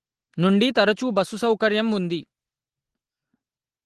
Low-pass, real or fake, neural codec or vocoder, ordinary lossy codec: 10.8 kHz; real; none; Opus, 16 kbps